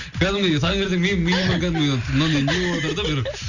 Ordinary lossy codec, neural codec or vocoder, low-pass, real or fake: none; none; 7.2 kHz; real